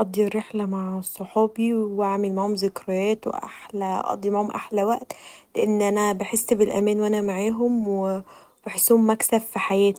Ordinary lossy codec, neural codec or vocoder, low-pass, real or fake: Opus, 24 kbps; none; 19.8 kHz; real